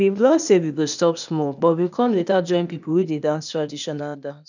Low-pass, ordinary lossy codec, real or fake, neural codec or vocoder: 7.2 kHz; none; fake; codec, 16 kHz, 0.8 kbps, ZipCodec